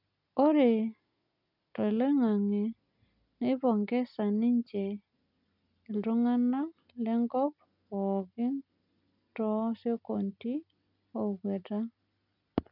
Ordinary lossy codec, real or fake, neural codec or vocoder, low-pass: none; real; none; 5.4 kHz